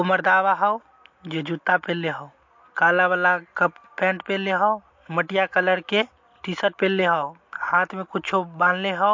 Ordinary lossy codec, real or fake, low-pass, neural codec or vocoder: MP3, 48 kbps; real; 7.2 kHz; none